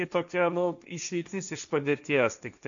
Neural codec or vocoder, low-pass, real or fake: codec, 16 kHz, 1.1 kbps, Voila-Tokenizer; 7.2 kHz; fake